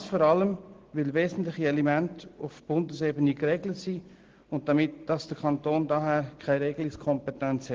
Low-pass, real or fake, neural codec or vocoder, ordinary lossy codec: 7.2 kHz; real; none; Opus, 16 kbps